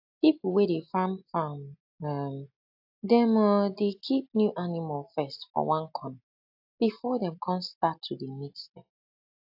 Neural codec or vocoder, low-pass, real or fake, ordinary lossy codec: none; 5.4 kHz; real; AAC, 48 kbps